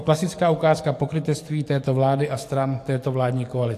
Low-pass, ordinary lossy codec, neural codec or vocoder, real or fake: 14.4 kHz; AAC, 64 kbps; codec, 44.1 kHz, 7.8 kbps, DAC; fake